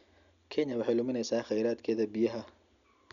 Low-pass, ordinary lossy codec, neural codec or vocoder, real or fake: 7.2 kHz; none; none; real